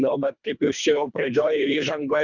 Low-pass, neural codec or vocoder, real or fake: 7.2 kHz; codec, 24 kHz, 1.5 kbps, HILCodec; fake